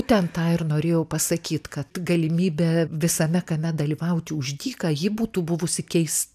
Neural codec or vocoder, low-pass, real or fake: none; 14.4 kHz; real